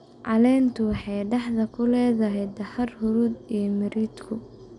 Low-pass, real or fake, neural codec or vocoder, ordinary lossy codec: 10.8 kHz; real; none; none